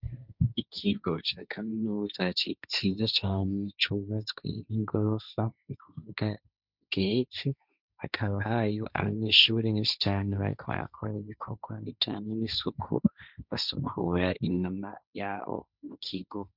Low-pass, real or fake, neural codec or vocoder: 5.4 kHz; fake; codec, 16 kHz, 1.1 kbps, Voila-Tokenizer